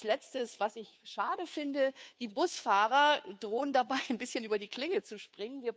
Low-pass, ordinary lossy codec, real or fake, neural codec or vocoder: none; none; fake; codec, 16 kHz, 2 kbps, FunCodec, trained on Chinese and English, 25 frames a second